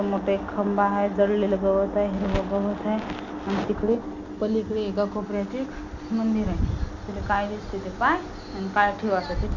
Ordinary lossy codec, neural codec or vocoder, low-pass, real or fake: none; none; 7.2 kHz; real